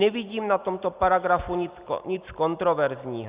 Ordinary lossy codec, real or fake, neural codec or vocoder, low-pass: Opus, 64 kbps; real; none; 3.6 kHz